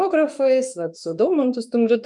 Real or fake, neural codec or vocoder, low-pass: fake; autoencoder, 48 kHz, 32 numbers a frame, DAC-VAE, trained on Japanese speech; 10.8 kHz